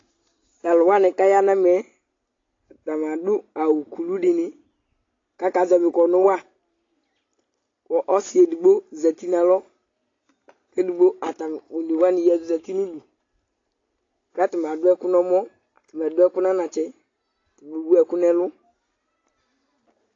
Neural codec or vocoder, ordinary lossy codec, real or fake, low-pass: none; AAC, 32 kbps; real; 7.2 kHz